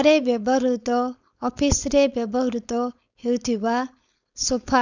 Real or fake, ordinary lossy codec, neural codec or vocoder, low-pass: fake; none; codec, 16 kHz, 4.8 kbps, FACodec; 7.2 kHz